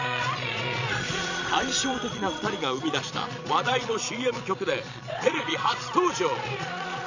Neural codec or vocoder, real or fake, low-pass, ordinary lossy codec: vocoder, 22.05 kHz, 80 mel bands, Vocos; fake; 7.2 kHz; none